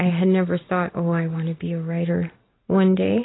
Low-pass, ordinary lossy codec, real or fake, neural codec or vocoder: 7.2 kHz; AAC, 16 kbps; real; none